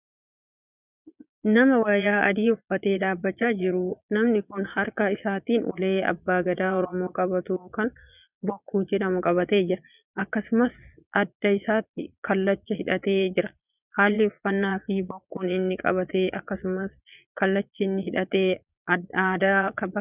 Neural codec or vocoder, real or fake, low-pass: vocoder, 22.05 kHz, 80 mel bands, Vocos; fake; 3.6 kHz